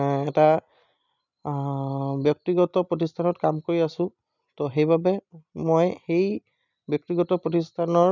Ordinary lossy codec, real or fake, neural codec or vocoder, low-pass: none; real; none; 7.2 kHz